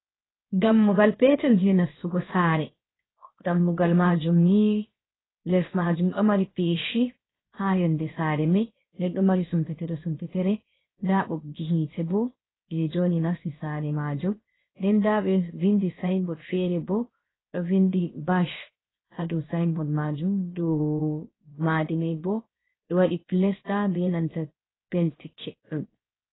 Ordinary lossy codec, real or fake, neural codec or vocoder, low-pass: AAC, 16 kbps; fake; codec, 16 kHz, 0.7 kbps, FocalCodec; 7.2 kHz